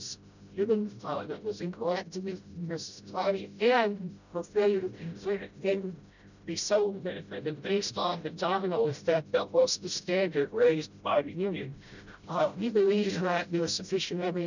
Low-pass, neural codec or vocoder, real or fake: 7.2 kHz; codec, 16 kHz, 0.5 kbps, FreqCodec, smaller model; fake